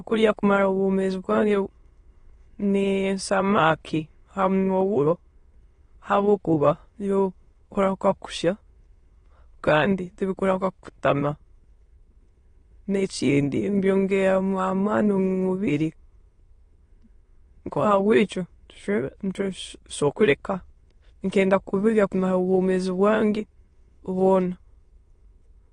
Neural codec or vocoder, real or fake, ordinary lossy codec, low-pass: autoencoder, 22.05 kHz, a latent of 192 numbers a frame, VITS, trained on many speakers; fake; AAC, 32 kbps; 9.9 kHz